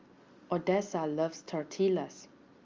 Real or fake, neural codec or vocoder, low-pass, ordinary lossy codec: real; none; 7.2 kHz; Opus, 32 kbps